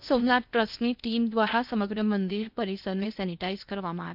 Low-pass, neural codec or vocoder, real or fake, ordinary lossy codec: 5.4 kHz; codec, 16 kHz in and 24 kHz out, 0.8 kbps, FocalCodec, streaming, 65536 codes; fake; none